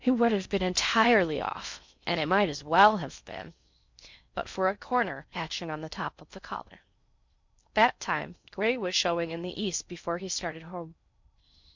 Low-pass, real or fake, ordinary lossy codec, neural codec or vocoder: 7.2 kHz; fake; MP3, 64 kbps; codec, 16 kHz in and 24 kHz out, 0.6 kbps, FocalCodec, streaming, 4096 codes